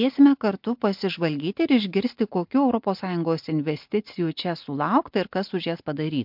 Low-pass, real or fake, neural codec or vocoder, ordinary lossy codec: 5.4 kHz; real; none; MP3, 48 kbps